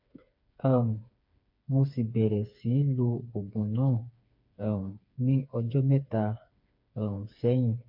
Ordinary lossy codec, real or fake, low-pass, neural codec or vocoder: MP3, 32 kbps; fake; 5.4 kHz; codec, 16 kHz, 4 kbps, FreqCodec, smaller model